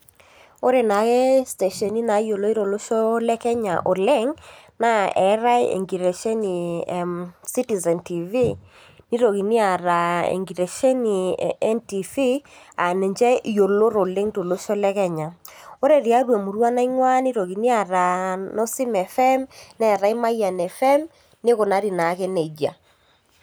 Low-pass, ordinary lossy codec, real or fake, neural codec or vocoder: none; none; real; none